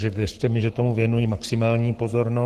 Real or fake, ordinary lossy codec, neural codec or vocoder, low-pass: fake; Opus, 16 kbps; codec, 44.1 kHz, 7.8 kbps, Pupu-Codec; 14.4 kHz